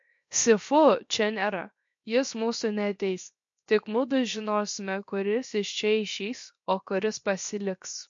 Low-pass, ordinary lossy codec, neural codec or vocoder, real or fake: 7.2 kHz; MP3, 48 kbps; codec, 16 kHz, 0.7 kbps, FocalCodec; fake